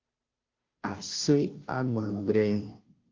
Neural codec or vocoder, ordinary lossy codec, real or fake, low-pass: codec, 16 kHz, 0.5 kbps, FunCodec, trained on Chinese and English, 25 frames a second; Opus, 24 kbps; fake; 7.2 kHz